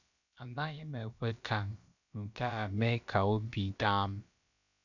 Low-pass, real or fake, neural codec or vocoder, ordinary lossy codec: 7.2 kHz; fake; codec, 16 kHz, about 1 kbps, DyCAST, with the encoder's durations; none